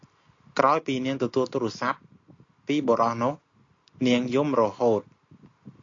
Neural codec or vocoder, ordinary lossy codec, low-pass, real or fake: none; AAC, 48 kbps; 7.2 kHz; real